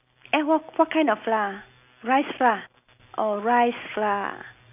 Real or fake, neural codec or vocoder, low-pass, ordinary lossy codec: real; none; 3.6 kHz; none